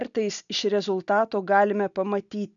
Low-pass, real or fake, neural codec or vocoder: 7.2 kHz; real; none